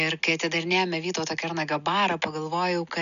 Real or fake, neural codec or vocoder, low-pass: real; none; 7.2 kHz